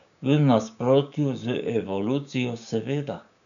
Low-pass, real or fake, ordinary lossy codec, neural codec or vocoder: 7.2 kHz; fake; none; codec, 16 kHz, 6 kbps, DAC